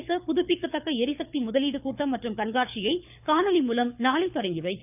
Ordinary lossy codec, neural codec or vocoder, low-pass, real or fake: none; codec, 24 kHz, 6 kbps, HILCodec; 3.6 kHz; fake